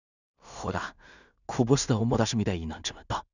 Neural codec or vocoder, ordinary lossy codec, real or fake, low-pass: codec, 16 kHz in and 24 kHz out, 0.4 kbps, LongCat-Audio-Codec, two codebook decoder; none; fake; 7.2 kHz